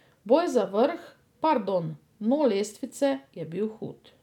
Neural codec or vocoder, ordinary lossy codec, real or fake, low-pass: none; none; real; 19.8 kHz